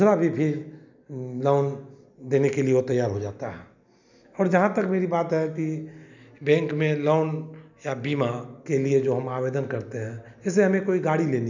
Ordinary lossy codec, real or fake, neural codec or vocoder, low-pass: none; real; none; 7.2 kHz